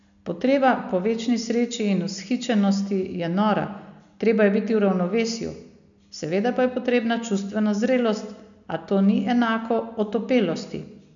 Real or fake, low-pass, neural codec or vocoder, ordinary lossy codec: real; 7.2 kHz; none; MP3, 96 kbps